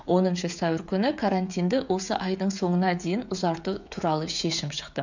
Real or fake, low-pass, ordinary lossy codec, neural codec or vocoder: fake; 7.2 kHz; none; codec, 16 kHz, 16 kbps, FreqCodec, smaller model